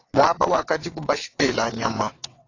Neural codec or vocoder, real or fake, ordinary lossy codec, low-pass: vocoder, 22.05 kHz, 80 mel bands, WaveNeXt; fake; AAC, 32 kbps; 7.2 kHz